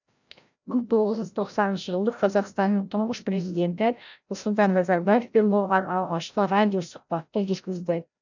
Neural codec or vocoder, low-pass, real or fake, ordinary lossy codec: codec, 16 kHz, 0.5 kbps, FreqCodec, larger model; 7.2 kHz; fake; none